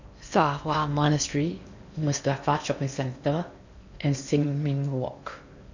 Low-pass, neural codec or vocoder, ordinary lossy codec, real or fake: 7.2 kHz; codec, 16 kHz in and 24 kHz out, 0.8 kbps, FocalCodec, streaming, 65536 codes; none; fake